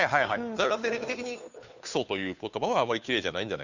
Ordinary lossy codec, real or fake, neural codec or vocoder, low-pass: none; fake; codec, 16 kHz, 2 kbps, FunCodec, trained on Chinese and English, 25 frames a second; 7.2 kHz